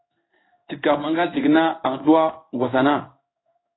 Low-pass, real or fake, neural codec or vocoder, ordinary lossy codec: 7.2 kHz; fake; codec, 16 kHz in and 24 kHz out, 1 kbps, XY-Tokenizer; AAC, 16 kbps